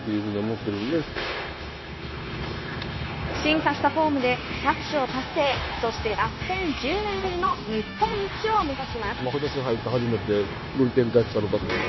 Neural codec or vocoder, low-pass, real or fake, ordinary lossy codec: codec, 16 kHz, 0.9 kbps, LongCat-Audio-Codec; 7.2 kHz; fake; MP3, 24 kbps